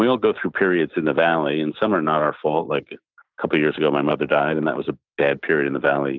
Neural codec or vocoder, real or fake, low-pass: none; real; 7.2 kHz